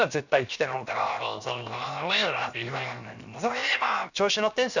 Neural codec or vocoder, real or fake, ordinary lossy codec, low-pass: codec, 16 kHz, 0.7 kbps, FocalCodec; fake; none; 7.2 kHz